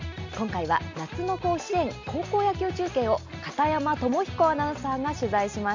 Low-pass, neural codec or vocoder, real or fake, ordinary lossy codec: 7.2 kHz; none; real; AAC, 48 kbps